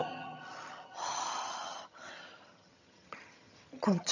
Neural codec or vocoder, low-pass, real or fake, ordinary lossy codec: vocoder, 22.05 kHz, 80 mel bands, HiFi-GAN; 7.2 kHz; fake; none